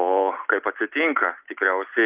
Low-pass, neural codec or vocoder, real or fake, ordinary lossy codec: 3.6 kHz; none; real; Opus, 24 kbps